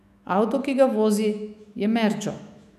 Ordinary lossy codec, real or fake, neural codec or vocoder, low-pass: none; fake; autoencoder, 48 kHz, 128 numbers a frame, DAC-VAE, trained on Japanese speech; 14.4 kHz